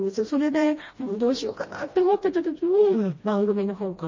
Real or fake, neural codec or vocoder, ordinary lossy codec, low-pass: fake; codec, 16 kHz, 1 kbps, FreqCodec, smaller model; MP3, 32 kbps; 7.2 kHz